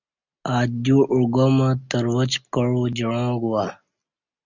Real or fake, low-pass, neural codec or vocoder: real; 7.2 kHz; none